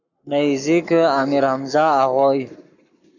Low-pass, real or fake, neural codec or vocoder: 7.2 kHz; fake; codec, 44.1 kHz, 7.8 kbps, Pupu-Codec